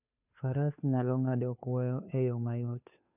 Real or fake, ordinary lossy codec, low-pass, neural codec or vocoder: fake; none; 3.6 kHz; codec, 16 kHz, 2 kbps, FunCodec, trained on Chinese and English, 25 frames a second